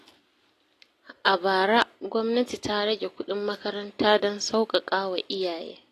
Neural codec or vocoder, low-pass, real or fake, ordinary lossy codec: none; 14.4 kHz; real; AAC, 48 kbps